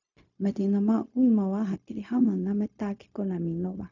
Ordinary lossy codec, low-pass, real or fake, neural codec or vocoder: none; 7.2 kHz; fake; codec, 16 kHz, 0.4 kbps, LongCat-Audio-Codec